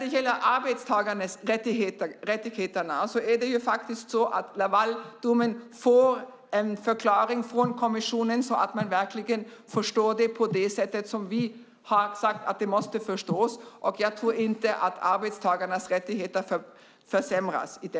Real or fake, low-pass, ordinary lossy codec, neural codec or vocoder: real; none; none; none